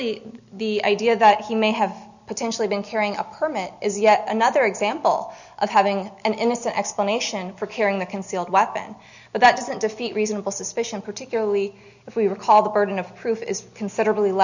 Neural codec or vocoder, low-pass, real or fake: none; 7.2 kHz; real